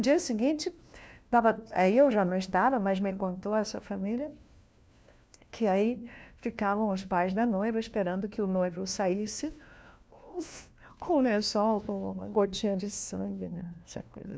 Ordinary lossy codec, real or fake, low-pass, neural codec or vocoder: none; fake; none; codec, 16 kHz, 1 kbps, FunCodec, trained on LibriTTS, 50 frames a second